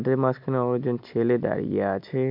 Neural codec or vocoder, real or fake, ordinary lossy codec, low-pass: none; real; MP3, 48 kbps; 5.4 kHz